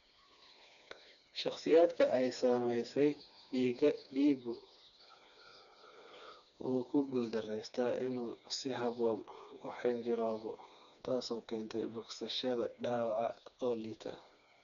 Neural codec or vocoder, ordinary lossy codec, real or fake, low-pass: codec, 16 kHz, 2 kbps, FreqCodec, smaller model; none; fake; 7.2 kHz